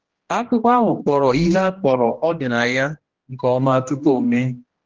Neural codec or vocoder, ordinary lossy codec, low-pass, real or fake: codec, 16 kHz, 1 kbps, X-Codec, HuBERT features, trained on general audio; Opus, 16 kbps; 7.2 kHz; fake